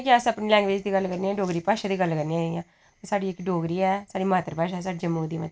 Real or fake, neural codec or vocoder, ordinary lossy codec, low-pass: real; none; none; none